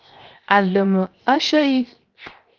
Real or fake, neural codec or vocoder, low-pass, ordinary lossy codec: fake; codec, 16 kHz, 0.7 kbps, FocalCodec; 7.2 kHz; Opus, 32 kbps